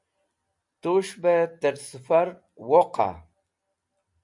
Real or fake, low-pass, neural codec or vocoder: real; 10.8 kHz; none